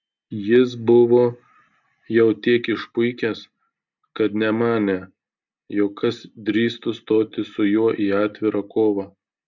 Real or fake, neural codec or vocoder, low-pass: real; none; 7.2 kHz